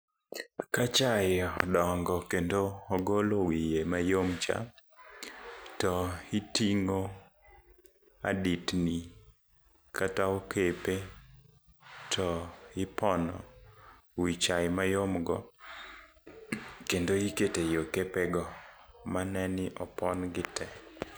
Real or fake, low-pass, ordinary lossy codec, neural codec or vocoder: real; none; none; none